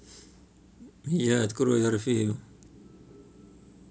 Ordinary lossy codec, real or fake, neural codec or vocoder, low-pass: none; real; none; none